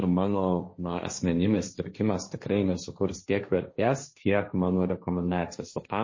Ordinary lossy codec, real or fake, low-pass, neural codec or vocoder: MP3, 32 kbps; fake; 7.2 kHz; codec, 16 kHz, 1.1 kbps, Voila-Tokenizer